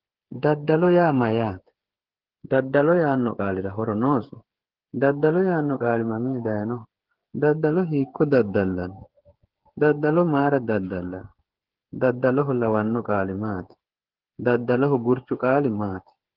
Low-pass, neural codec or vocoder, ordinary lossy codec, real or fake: 5.4 kHz; codec, 16 kHz, 8 kbps, FreqCodec, smaller model; Opus, 16 kbps; fake